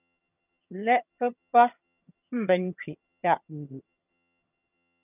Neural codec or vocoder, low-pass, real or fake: vocoder, 22.05 kHz, 80 mel bands, HiFi-GAN; 3.6 kHz; fake